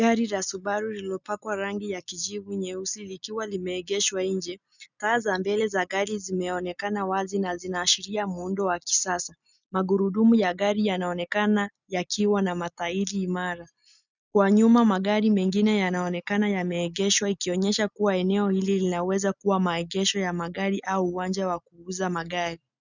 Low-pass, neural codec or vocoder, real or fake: 7.2 kHz; none; real